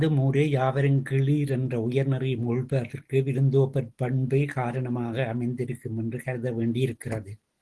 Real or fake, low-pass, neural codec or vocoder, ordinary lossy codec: real; 10.8 kHz; none; Opus, 16 kbps